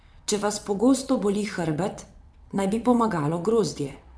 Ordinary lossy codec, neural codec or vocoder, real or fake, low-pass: none; vocoder, 22.05 kHz, 80 mel bands, WaveNeXt; fake; none